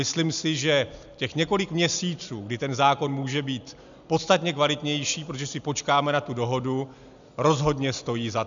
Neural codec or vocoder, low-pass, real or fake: none; 7.2 kHz; real